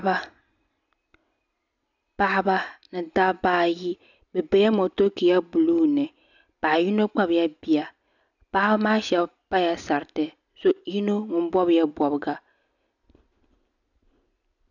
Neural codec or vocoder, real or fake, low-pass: none; real; 7.2 kHz